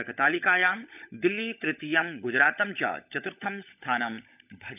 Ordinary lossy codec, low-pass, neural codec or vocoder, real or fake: none; 3.6 kHz; codec, 16 kHz, 16 kbps, FunCodec, trained on LibriTTS, 50 frames a second; fake